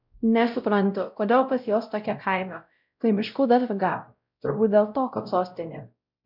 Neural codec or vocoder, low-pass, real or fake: codec, 16 kHz, 0.5 kbps, X-Codec, WavLM features, trained on Multilingual LibriSpeech; 5.4 kHz; fake